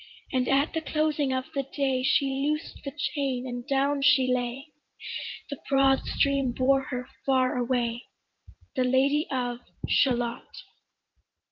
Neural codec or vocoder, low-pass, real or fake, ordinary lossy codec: vocoder, 44.1 kHz, 128 mel bands every 512 samples, BigVGAN v2; 7.2 kHz; fake; Opus, 24 kbps